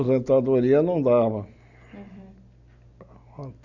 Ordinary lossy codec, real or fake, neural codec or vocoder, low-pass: none; real; none; 7.2 kHz